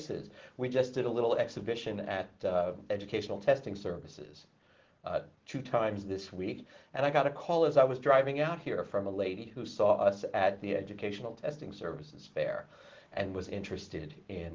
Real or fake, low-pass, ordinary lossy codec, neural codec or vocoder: real; 7.2 kHz; Opus, 16 kbps; none